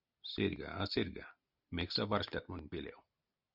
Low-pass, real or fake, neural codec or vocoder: 5.4 kHz; real; none